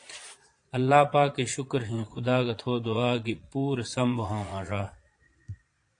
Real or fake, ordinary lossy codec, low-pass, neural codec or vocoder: fake; AAC, 48 kbps; 9.9 kHz; vocoder, 22.05 kHz, 80 mel bands, Vocos